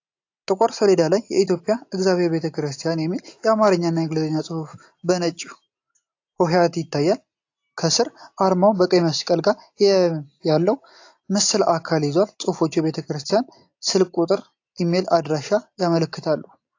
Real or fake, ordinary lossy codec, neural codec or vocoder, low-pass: real; AAC, 48 kbps; none; 7.2 kHz